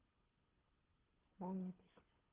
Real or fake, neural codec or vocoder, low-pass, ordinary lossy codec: fake; codec, 24 kHz, 6 kbps, HILCodec; 3.6 kHz; Opus, 16 kbps